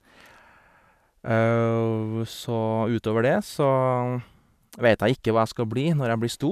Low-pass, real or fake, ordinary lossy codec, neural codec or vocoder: 14.4 kHz; real; none; none